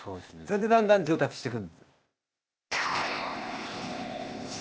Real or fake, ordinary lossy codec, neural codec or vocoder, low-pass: fake; none; codec, 16 kHz, 0.8 kbps, ZipCodec; none